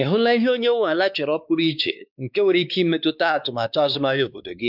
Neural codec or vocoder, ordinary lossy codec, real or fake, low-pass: codec, 16 kHz, 2 kbps, X-Codec, WavLM features, trained on Multilingual LibriSpeech; none; fake; 5.4 kHz